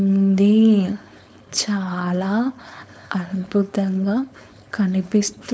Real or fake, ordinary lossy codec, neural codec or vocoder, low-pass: fake; none; codec, 16 kHz, 4.8 kbps, FACodec; none